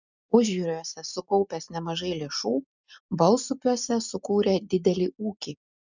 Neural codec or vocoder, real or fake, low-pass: none; real; 7.2 kHz